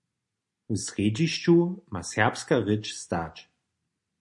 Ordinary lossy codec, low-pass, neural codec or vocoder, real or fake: MP3, 48 kbps; 10.8 kHz; none; real